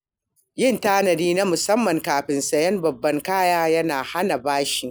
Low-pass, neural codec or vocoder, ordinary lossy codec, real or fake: none; none; none; real